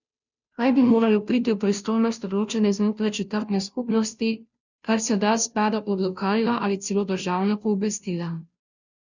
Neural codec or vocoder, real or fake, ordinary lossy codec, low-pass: codec, 16 kHz, 0.5 kbps, FunCodec, trained on Chinese and English, 25 frames a second; fake; none; 7.2 kHz